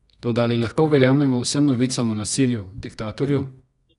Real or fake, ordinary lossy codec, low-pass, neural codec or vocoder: fake; none; 10.8 kHz; codec, 24 kHz, 0.9 kbps, WavTokenizer, medium music audio release